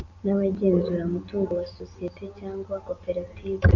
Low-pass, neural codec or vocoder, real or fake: 7.2 kHz; none; real